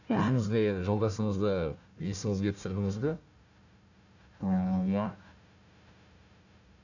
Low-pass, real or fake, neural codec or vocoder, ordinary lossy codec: 7.2 kHz; fake; codec, 16 kHz, 1 kbps, FunCodec, trained on Chinese and English, 50 frames a second; none